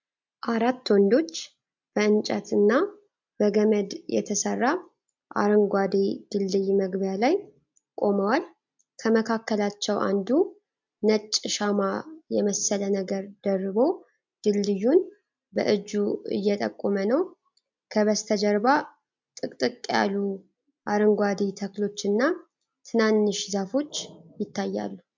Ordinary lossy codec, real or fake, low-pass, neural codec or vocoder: MP3, 64 kbps; real; 7.2 kHz; none